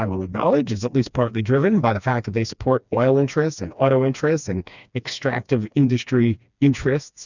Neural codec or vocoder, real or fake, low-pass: codec, 16 kHz, 2 kbps, FreqCodec, smaller model; fake; 7.2 kHz